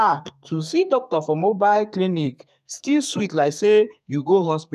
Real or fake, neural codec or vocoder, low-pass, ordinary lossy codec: fake; codec, 32 kHz, 1.9 kbps, SNAC; 14.4 kHz; none